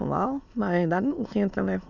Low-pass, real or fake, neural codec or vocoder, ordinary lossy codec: 7.2 kHz; fake; autoencoder, 22.05 kHz, a latent of 192 numbers a frame, VITS, trained on many speakers; none